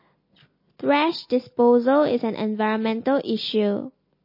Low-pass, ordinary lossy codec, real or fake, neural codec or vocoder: 5.4 kHz; MP3, 24 kbps; real; none